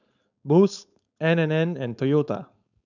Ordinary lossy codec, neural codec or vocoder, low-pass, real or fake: none; codec, 16 kHz, 4.8 kbps, FACodec; 7.2 kHz; fake